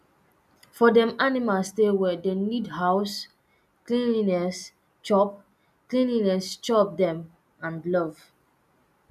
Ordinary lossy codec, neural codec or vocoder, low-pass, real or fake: none; none; 14.4 kHz; real